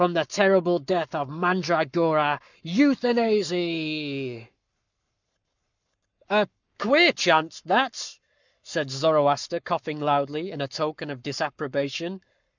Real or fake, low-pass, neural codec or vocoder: fake; 7.2 kHz; codec, 44.1 kHz, 7.8 kbps, Pupu-Codec